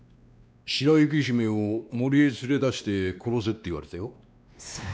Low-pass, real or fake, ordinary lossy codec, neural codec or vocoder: none; fake; none; codec, 16 kHz, 2 kbps, X-Codec, WavLM features, trained on Multilingual LibriSpeech